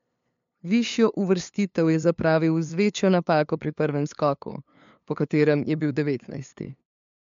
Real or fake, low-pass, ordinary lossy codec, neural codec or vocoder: fake; 7.2 kHz; MP3, 64 kbps; codec, 16 kHz, 2 kbps, FunCodec, trained on LibriTTS, 25 frames a second